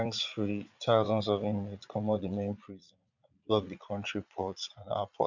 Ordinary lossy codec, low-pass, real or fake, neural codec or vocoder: none; 7.2 kHz; fake; vocoder, 22.05 kHz, 80 mel bands, Vocos